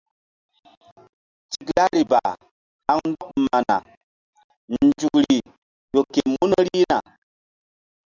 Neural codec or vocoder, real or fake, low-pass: none; real; 7.2 kHz